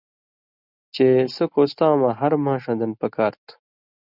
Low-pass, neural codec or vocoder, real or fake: 5.4 kHz; none; real